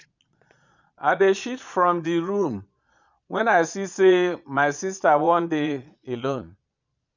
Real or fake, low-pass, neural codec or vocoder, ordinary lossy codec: fake; 7.2 kHz; vocoder, 22.05 kHz, 80 mel bands, Vocos; none